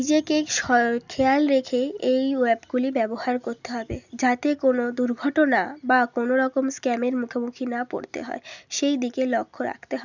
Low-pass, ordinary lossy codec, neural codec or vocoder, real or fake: 7.2 kHz; none; none; real